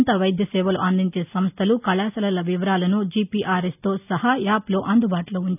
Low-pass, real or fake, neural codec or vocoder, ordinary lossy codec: 3.6 kHz; real; none; none